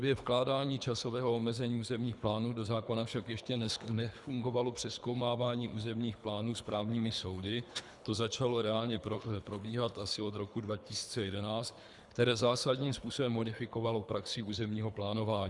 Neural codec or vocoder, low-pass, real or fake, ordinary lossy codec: codec, 24 kHz, 3 kbps, HILCodec; 10.8 kHz; fake; Opus, 64 kbps